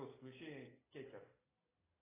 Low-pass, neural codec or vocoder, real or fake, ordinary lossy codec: 3.6 kHz; none; real; AAC, 16 kbps